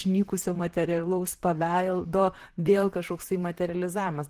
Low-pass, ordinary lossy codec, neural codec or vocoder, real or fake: 14.4 kHz; Opus, 16 kbps; vocoder, 44.1 kHz, 128 mel bands, Pupu-Vocoder; fake